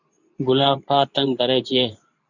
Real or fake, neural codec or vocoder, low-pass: fake; codec, 24 kHz, 0.9 kbps, WavTokenizer, medium speech release version 2; 7.2 kHz